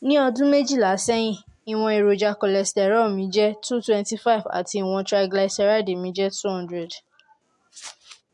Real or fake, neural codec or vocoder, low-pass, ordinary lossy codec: real; none; 10.8 kHz; MP3, 64 kbps